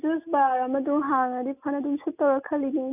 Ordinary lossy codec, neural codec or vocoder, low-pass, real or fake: none; none; 3.6 kHz; real